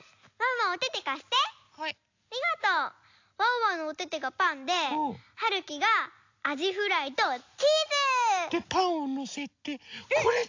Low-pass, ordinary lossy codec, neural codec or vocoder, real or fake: 7.2 kHz; AAC, 48 kbps; none; real